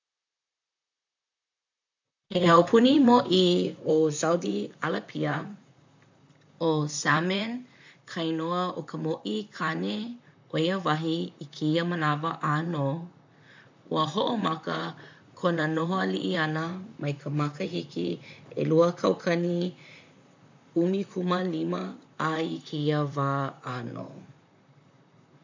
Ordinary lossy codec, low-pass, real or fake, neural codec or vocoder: MP3, 64 kbps; 7.2 kHz; fake; vocoder, 44.1 kHz, 128 mel bands, Pupu-Vocoder